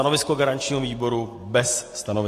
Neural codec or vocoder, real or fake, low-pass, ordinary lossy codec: none; real; 14.4 kHz; AAC, 48 kbps